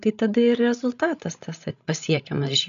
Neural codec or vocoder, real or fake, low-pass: codec, 16 kHz, 16 kbps, FreqCodec, larger model; fake; 7.2 kHz